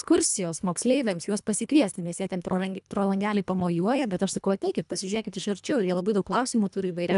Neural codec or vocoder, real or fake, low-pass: codec, 24 kHz, 1.5 kbps, HILCodec; fake; 10.8 kHz